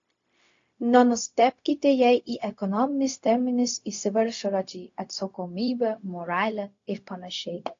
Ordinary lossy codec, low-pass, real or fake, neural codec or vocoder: AAC, 48 kbps; 7.2 kHz; fake; codec, 16 kHz, 0.4 kbps, LongCat-Audio-Codec